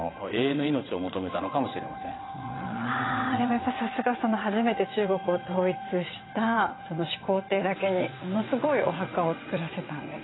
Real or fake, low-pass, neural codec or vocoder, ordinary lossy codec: fake; 7.2 kHz; vocoder, 22.05 kHz, 80 mel bands, WaveNeXt; AAC, 16 kbps